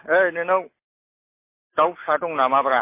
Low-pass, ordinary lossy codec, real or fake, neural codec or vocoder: 3.6 kHz; MP3, 24 kbps; real; none